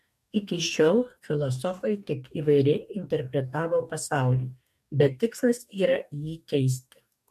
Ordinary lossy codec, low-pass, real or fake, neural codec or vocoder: MP3, 96 kbps; 14.4 kHz; fake; codec, 44.1 kHz, 2.6 kbps, DAC